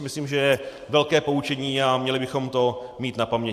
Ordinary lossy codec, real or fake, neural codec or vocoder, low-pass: AAC, 96 kbps; fake; vocoder, 44.1 kHz, 128 mel bands every 512 samples, BigVGAN v2; 14.4 kHz